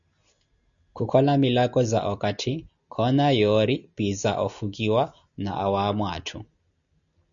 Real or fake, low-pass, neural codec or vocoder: real; 7.2 kHz; none